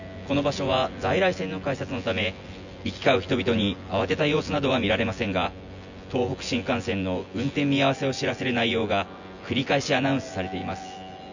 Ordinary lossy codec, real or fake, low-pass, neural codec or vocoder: none; fake; 7.2 kHz; vocoder, 24 kHz, 100 mel bands, Vocos